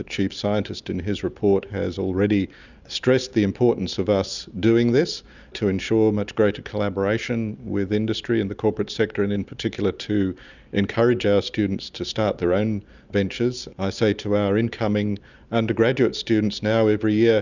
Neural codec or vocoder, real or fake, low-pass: none; real; 7.2 kHz